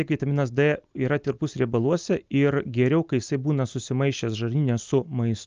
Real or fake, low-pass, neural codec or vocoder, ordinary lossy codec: real; 7.2 kHz; none; Opus, 24 kbps